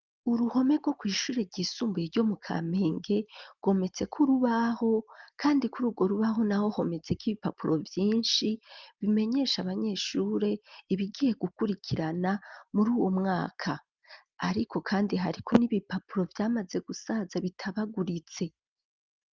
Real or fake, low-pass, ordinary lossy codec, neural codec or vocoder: real; 7.2 kHz; Opus, 32 kbps; none